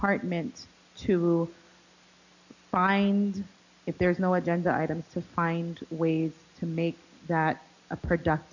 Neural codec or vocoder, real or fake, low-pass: none; real; 7.2 kHz